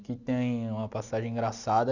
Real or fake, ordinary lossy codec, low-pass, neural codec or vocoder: real; none; 7.2 kHz; none